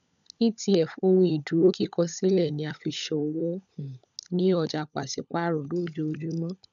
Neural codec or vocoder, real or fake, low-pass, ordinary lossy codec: codec, 16 kHz, 16 kbps, FunCodec, trained on LibriTTS, 50 frames a second; fake; 7.2 kHz; none